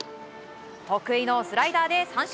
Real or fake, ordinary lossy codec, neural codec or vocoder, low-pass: real; none; none; none